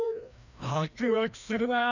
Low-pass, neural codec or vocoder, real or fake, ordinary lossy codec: 7.2 kHz; codec, 16 kHz, 1 kbps, FreqCodec, larger model; fake; none